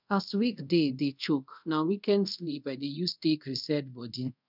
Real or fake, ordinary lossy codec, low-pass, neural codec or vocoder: fake; none; 5.4 kHz; codec, 24 kHz, 0.5 kbps, DualCodec